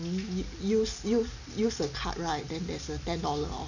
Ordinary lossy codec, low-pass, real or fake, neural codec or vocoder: none; 7.2 kHz; real; none